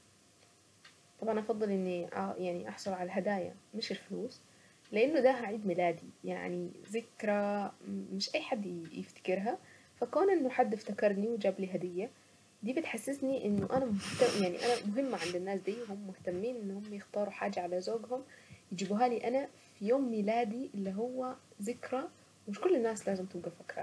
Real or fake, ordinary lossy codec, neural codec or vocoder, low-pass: real; none; none; none